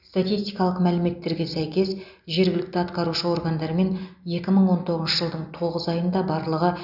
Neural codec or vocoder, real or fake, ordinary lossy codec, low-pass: none; real; none; 5.4 kHz